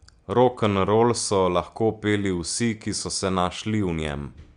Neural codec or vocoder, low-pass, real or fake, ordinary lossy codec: none; 9.9 kHz; real; Opus, 64 kbps